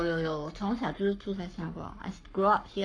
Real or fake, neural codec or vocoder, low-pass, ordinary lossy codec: fake; codec, 44.1 kHz, 7.8 kbps, Pupu-Codec; 9.9 kHz; none